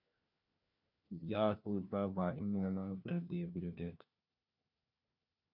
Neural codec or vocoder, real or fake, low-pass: codec, 16 kHz, 1 kbps, FunCodec, trained on LibriTTS, 50 frames a second; fake; 5.4 kHz